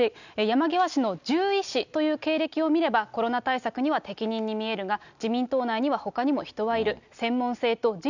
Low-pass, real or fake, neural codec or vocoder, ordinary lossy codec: 7.2 kHz; real; none; none